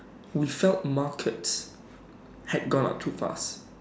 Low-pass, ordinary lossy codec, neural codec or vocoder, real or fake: none; none; none; real